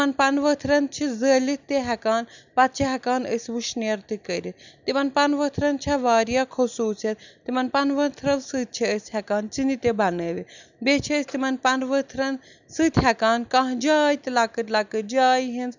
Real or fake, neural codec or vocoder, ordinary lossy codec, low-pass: real; none; none; 7.2 kHz